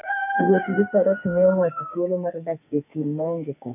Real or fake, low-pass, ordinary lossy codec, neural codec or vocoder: fake; 3.6 kHz; none; codec, 44.1 kHz, 2.6 kbps, SNAC